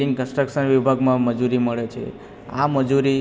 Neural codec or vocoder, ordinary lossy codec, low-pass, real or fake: none; none; none; real